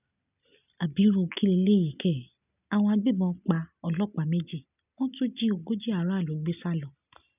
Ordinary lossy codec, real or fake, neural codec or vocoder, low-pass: none; real; none; 3.6 kHz